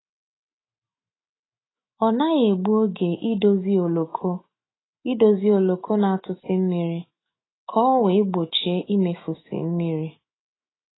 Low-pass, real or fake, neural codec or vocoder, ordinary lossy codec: 7.2 kHz; fake; autoencoder, 48 kHz, 128 numbers a frame, DAC-VAE, trained on Japanese speech; AAC, 16 kbps